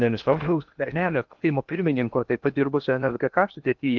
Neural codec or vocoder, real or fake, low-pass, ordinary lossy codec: codec, 16 kHz in and 24 kHz out, 0.8 kbps, FocalCodec, streaming, 65536 codes; fake; 7.2 kHz; Opus, 32 kbps